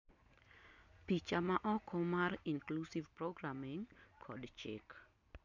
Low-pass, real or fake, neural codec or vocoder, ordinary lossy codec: 7.2 kHz; real; none; none